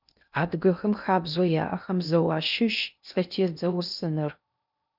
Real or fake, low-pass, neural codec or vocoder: fake; 5.4 kHz; codec, 16 kHz in and 24 kHz out, 0.6 kbps, FocalCodec, streaming, 2048 codes